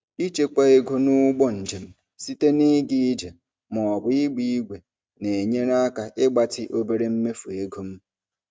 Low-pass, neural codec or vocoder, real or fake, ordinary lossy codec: none; none; real; none